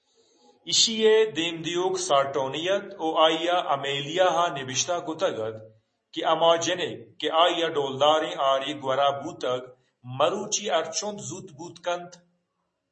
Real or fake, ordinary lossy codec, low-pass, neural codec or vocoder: real; MP3, 32 kbps; 10.8 kHz; none